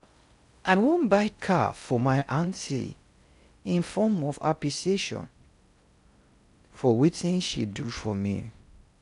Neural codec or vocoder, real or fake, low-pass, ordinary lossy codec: codec, 16 kHz in and 24 kHz out, 0.6 kbps, FocalCodec, streaming, 4096 codes; fake; 10.8 kHz; none